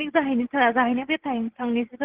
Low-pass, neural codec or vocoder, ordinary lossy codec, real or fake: 3.6 kHz; none; Opus, 16 kbps; real